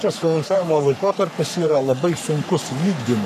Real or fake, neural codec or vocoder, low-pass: fake; codec, 44.1 kHz, 3.4 kbps, Pupu-Codec; 14.4 kHz